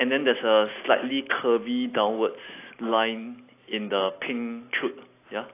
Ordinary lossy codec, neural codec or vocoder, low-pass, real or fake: AAC, 24 kbps; none; 3.6 kHz; real